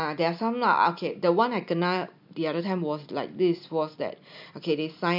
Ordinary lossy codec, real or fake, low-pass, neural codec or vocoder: none; real; 5.4 kHz; none